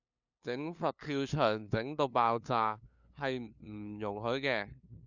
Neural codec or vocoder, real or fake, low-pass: codec, 16 kHz, 2 kbps, FunCodec, trained on LibriTTS, 25 frames a second; fake; 7.2 kHz